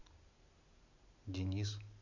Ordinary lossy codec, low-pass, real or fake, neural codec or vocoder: none; 7.2 kHz; real; none